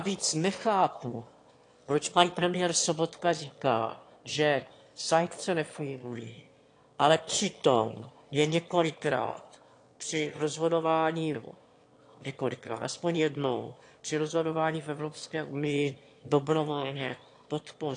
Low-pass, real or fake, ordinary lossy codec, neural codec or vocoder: 9.9 kHz; fake; AAC, 48 kbps; autoencoder, 22.05 kHz, a latent of 192 numbers a frame, VITS, trained on one speaker